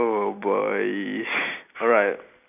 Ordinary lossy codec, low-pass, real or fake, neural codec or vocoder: none; 3.6 kHz; real; none